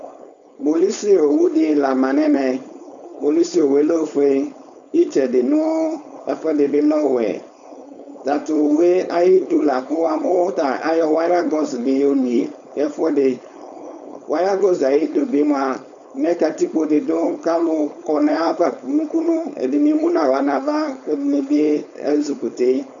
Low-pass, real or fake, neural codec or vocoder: 7.2 kHz; fake; codec, 16 kHz, 4.8 kbps, FACodec